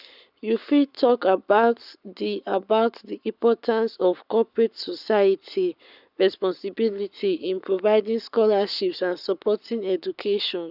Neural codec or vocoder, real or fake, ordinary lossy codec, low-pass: codec, 44.1 kHz, 7.8 kbps, Pupu-Codec; fake; none; 5.4 kHz